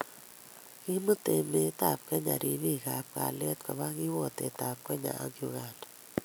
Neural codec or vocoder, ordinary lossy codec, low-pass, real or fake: none; none; none; real